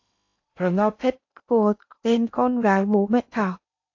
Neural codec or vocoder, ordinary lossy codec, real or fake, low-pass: codec, 16 kHz in and 24 kHz out, 0.8 kbps, FocalCodec, streaming, 65536 codes; AAC, 48 kbps; fake; 7.2 kHz